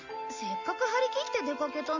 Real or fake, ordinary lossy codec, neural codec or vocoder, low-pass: real; none; none; 7.2 kHz